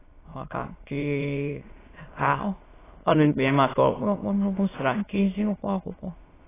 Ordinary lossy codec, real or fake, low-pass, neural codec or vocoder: AAC, 16 kbps; fake; 3.6 kHz; autoencoder, 22.05 kHz, a latent of 192 numbers a frame, VITS, trained on many speakers